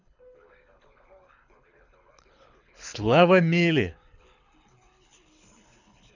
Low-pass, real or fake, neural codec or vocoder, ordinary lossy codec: 7.2 kHz; fake; codec, 24 kHz, 6 kbps, HILCodec; none